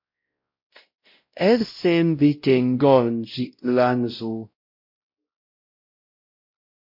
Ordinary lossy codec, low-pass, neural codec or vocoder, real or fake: MP3, 32 kbps; 5.4 kHz; codec, 16 kHz, 0.5 kbps, X-Codec, WavLM features, trained on Multilingual LibriSpeech; fake